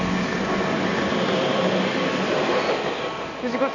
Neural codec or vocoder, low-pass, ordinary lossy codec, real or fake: none; 7.2 kHz; none; real